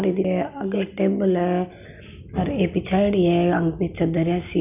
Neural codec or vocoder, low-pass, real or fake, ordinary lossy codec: none; 3.6 kHz; real; none